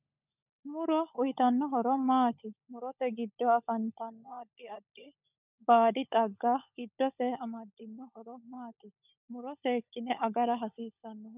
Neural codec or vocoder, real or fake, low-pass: codec, 16 kHz, 16 kbps, FunCodec, trained on LibriTTS, 50 frames a second; fake; 3.6 kHz